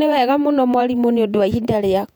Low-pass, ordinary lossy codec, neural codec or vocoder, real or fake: 19.8 kHz; none; vocoder, 48 kHz, 128 mel bands, Vocos; fake